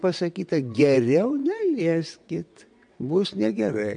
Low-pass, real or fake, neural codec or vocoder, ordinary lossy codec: 9.9 kHz; fake; vocoder, 22.05 kHz, 80 mel bands, WaveNeXt; MP3, 64 kbps